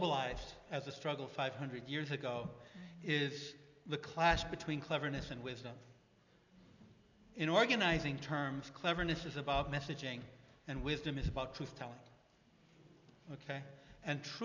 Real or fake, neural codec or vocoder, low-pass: real; none; 7.2 kHz